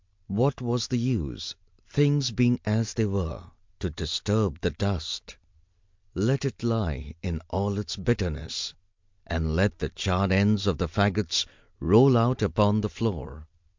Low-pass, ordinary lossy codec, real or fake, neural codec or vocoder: 7.2 kHz; MP3, 64 kbps; real; none